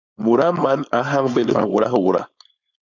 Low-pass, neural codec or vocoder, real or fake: 7.2 kHz; codec, 16 kHz, 4.8 kbps, FACodec; fake